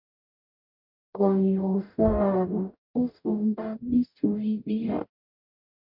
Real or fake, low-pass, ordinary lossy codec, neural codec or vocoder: fake; 5.4 kHz; MP3, 48 kbps; codec, 44.1 kHz, 0.9 kbps, DAC